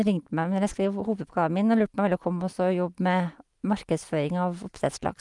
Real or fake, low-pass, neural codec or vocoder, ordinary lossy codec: real; 10.8 kHz; none; Opus, 32 kbps